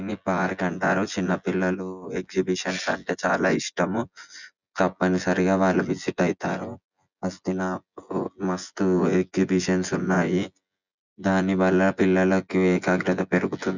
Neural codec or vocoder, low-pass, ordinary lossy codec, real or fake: vocoder, 24 kHz, 100 mel bands, Vocos; 7.2 kHz; none; fake